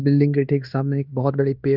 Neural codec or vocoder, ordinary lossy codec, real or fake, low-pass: codec, 16 kHz in and 24 kHz out, 0.9 kbps, LongCat-Audio-Codec, fine tuned four codebook decoder; none; fake; 5.4 kHz